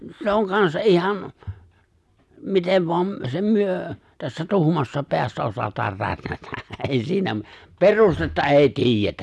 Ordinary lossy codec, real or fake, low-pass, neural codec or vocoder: none; real; none; none